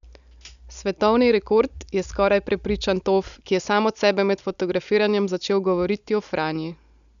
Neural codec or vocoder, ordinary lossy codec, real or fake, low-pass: none; none; real; 7.2 kHz